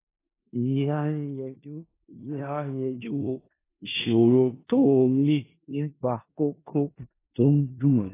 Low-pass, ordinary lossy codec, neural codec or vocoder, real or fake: 3.6 kHz; AAC, 16 kbps; codec, 16 kHz in and 24 kHz out, 0.4 kbps, LongCat-Audio-Codec, four codebook decoder; fake